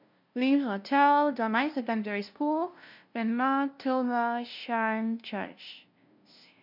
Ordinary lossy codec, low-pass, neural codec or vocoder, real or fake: MP3, 48 kbps; 5.4 kHz; codec, 16 kHz, 0.5 kbps, FunCodec, trained on LibriTTS, 25 frames a second; fake